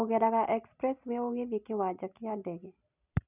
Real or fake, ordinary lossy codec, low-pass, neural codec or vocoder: real; none; 3.6 kHz; none